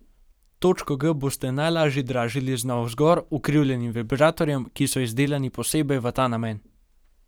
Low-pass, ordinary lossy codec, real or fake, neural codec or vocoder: none; none; real; none